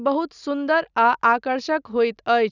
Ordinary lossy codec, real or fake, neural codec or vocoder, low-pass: none; real; none; 7.2 kHz